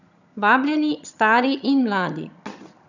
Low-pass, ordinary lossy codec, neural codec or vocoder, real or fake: 7.2 kHz; none; vocoder, 22.05 kHz, 80 mel bands, HiFi-GAN; fake